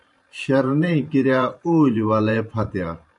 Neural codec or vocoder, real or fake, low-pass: vocoder, 44.1 kHz, 128 mel bands every 512 samples, BigVGAN v2; fake; 10.8 kHz